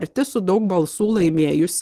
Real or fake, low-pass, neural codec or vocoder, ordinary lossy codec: real; 14.4 kHz; none; Opus, 16 kbps